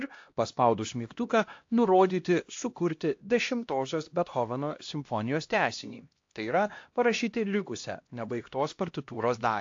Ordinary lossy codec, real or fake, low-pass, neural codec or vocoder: AAC, 48 kbps; fake; 7.2 kHz; codec, 16 kHz, 1 kbps, X-Codec, HuBERT features, trained on LibriSpeech